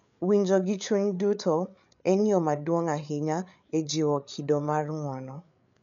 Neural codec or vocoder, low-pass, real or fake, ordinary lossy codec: codec, 16 kHz, 8 kbps, FreqCodec, larger model; 7.2 kHz; fake; none